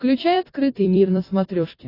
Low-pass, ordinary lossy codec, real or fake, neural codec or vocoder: 5.4 kHz; AAC, 24 kbps; fake; vocoder, 44.1 kHz, 128 mel bands every 256 samples, BigVGAN v2